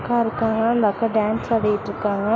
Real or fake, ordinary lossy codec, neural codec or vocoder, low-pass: real; none; none; none